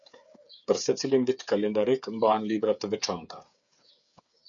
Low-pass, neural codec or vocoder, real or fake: 7.2 kHz; codec, 16 kHz, 8 kbps, FreqCodec, smaller model; fake